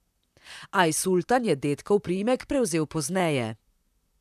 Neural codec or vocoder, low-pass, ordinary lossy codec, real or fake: vocoder, 44.1 kHz, 128 mel bands, Pupu-Vocoder; 14.4 kHz; none; fake